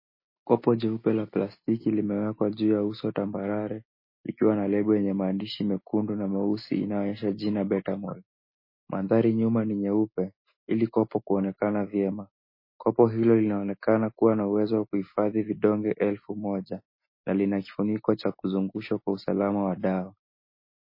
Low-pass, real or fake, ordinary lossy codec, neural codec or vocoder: 5.4 kHz; real; MP3, 24 kbps; none